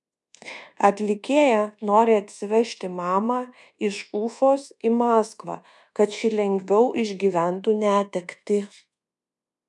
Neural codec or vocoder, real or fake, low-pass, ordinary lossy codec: codec, 24 kHz, 1.2 kbps, DualCodec; fake; 10.8 kHz; MP3, 96 kbps